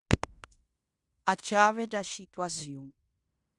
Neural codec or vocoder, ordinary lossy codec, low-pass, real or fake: codec, 16 kHz in and 24 kHz out, 0.9 kbps, LongCat-Audio-Codec, fine tuned four codebook decoder; Opus, 64 kbps; 10.8 kHz; fake